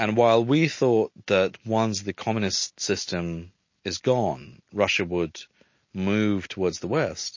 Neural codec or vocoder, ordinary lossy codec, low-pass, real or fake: none; MP3, 32 kbps; 7.2 kHz; real